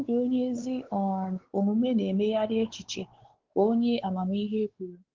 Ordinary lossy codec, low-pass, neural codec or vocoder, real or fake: Opus, 16 kbps; 7.2 kHz; codec, 16 kHz, 4 kbps, X-Codec, WavLM features, trained on Multilingual LibriSpeech; fake